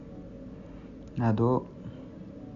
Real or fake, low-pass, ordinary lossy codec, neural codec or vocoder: real; 7.2 kHz; AAC, 64 kbps; none